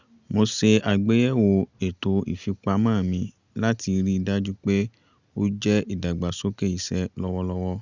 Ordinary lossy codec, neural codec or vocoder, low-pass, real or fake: Opus, 64 kbps; none; 7.2 kHz; real